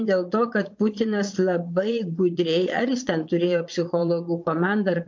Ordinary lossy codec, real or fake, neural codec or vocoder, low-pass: MP3, 48 kbps; fake; vocoder, 22.05 kHz, 80 mel bands, Vocos; 7.2 kHz